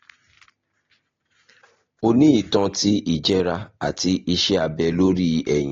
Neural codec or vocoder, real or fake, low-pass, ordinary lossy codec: none; real; 7.2 kHz; AAC, 32 kbps